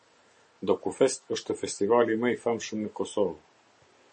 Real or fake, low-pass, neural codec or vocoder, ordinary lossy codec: real; 10.8 kHz; none; MP3, 32 kbps